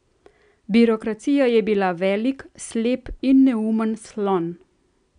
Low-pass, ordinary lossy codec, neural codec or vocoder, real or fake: 9.9 kHz; none; none; real